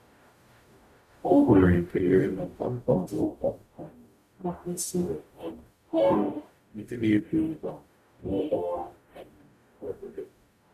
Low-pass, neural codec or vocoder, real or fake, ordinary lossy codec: 14.4 kHz; codec, 44.1 kHz, 0.9 kbps, DAC; fake; none